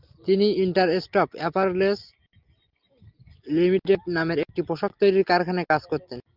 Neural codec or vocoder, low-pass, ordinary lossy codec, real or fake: none; 5.4 kHz; Opus, 24 kbps; real